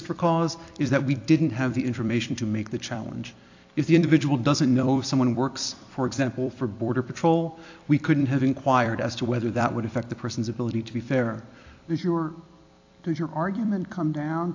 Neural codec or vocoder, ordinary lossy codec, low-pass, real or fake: vocoder, 44.1 kHz, 128 mel bands every 256 samples, BigVGAN v2; AAC, 48 kbps; 7.2 kHz; fake